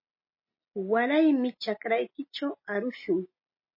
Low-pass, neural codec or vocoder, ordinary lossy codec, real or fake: 5.4 kHz; none; MP3, 24 kbps; real